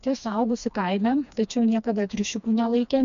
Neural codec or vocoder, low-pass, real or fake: codec, 16 kHz, 2 kbps, FreqCodec, smaller model; 7.2 kHz; fake